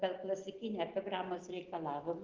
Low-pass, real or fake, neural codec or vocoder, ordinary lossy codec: 7.2 kHz; real; none; Opus, 16 kbps